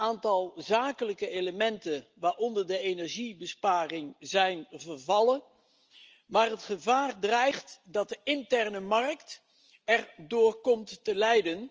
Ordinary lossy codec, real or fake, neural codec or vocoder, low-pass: Opus, 24 kbps; real; none; 7.2 kHz